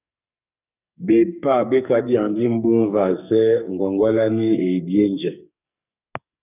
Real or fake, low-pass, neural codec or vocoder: fake; 3.6 kHz; codec, 44.1 kHz, 2.6 kbps, SNAC